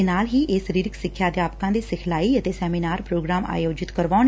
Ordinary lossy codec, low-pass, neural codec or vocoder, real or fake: none; none; none; real